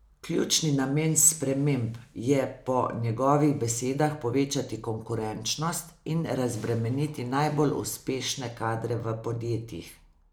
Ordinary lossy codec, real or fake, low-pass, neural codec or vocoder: none; real; none; none